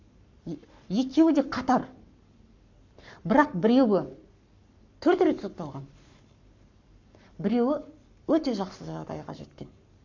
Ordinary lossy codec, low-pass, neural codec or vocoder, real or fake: none; 7.2 kHz; codec, 44.1 kHz, 7.8 kbps, Pupu-Codec; fake